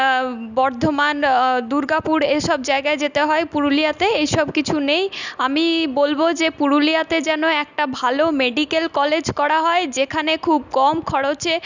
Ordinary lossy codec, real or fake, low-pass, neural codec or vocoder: none; real; 7.2 kHz; none